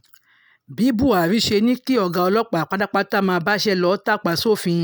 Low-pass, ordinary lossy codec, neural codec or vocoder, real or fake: none; none; none; real